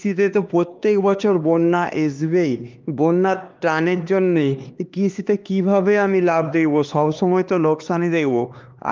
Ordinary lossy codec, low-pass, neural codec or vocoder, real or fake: Opus, 24 kbps; 7.2 kHz; codec, 16 kHz, 2 kbps, X-Codec, HuBERT features, trained on LibriSpeech; fake